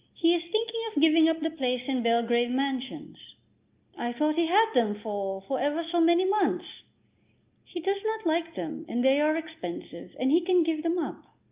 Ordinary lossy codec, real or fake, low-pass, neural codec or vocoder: Opus, 32 kbps; real; 3.6 kHz; none